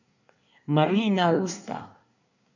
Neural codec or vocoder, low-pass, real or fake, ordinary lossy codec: codec, 24 kHz, 1 kbps, SNAC; 7.2 kHz; fake; none